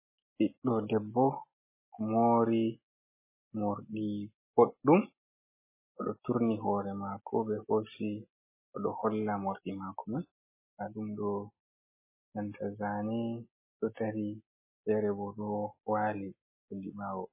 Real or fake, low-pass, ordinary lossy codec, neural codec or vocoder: real; 3.6 kHz; MP3, 16 kbps; none